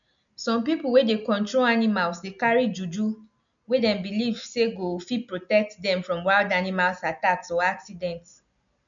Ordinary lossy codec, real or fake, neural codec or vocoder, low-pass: none; real; none; 7.2 kHz